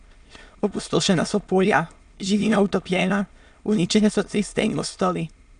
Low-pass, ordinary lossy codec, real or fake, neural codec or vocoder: 9.9 kHz; none; fake; autoencoder, 22.05 kHz, a latent of 192 numbers a frame, VITS, trained on many speakers